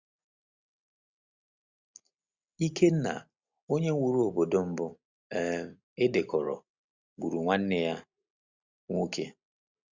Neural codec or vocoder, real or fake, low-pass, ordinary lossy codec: none; real; 7.2 kHz; Opus, 64 kbps